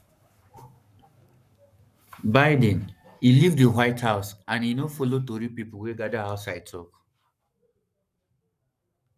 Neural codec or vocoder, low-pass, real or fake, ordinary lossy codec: codec, 44.1 kHz, 7.8 kbps, Pupu-Codec; 14.4 kHz; fake; none